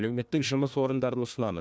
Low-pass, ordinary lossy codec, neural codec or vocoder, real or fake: none; none; codec, 16 kHz, 1 kbps, FunCodec, trained on Chinese and English, 50 frames a second; fake